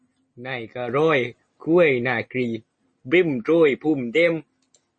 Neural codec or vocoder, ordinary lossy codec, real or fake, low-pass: none; MP3, 32 kbps; real; 9.9 kHz